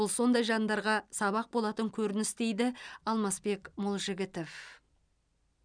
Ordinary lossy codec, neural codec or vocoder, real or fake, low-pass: none; none; real; 9.9 kHz